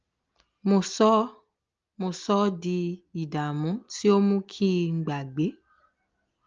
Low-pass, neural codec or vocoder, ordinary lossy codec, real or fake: 7.2 kHz; none; Opus, 24 kbps; real